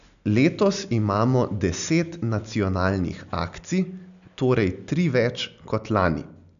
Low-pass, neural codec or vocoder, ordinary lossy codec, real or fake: 7.2 kHz; none; none; real